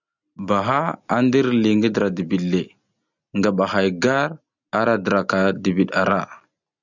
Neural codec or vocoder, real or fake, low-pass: none; real; 7.2 kHz